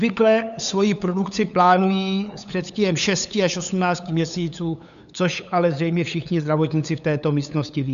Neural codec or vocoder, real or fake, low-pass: codec, 16 kHz, 8 kbps, FunCodec, trained on LibriTTS, 25 frames a second; fake; 7.2 kHz